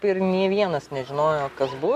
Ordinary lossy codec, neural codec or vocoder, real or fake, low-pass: MP3, 96 kbps; none; real; 14.4 kHz